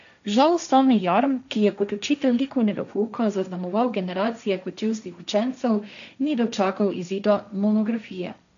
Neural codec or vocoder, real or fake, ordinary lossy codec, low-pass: codec, 16 kHz, 1.1 kbps, Voila-Tokenizer; fake; none; 7.2 kHz